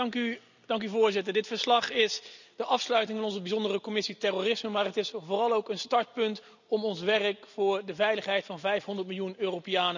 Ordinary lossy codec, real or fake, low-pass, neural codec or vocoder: none; real; 7.2 kHz; none